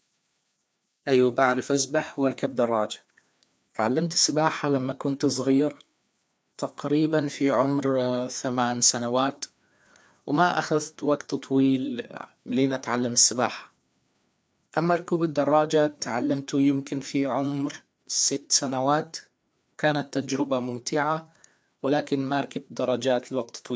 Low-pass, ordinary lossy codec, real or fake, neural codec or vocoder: none; none; fake; codec, 16 kHz, 2 kbps, FreqCodec, larger model